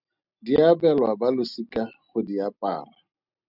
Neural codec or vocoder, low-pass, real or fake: none; 5.4 kHz; real